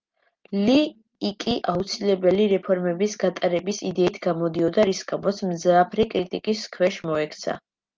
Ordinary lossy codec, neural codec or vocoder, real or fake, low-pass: Opus, 32 kbps; none; real; 7.2 kHz